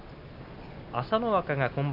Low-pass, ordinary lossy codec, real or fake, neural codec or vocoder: 5.4 kHz; none; real; none